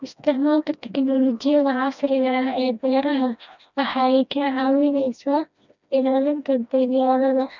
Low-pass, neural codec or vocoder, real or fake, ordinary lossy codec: 7.2 kHz; codec, 16 kHz, 1 kbps, FreqCodec, smaller model; fake; none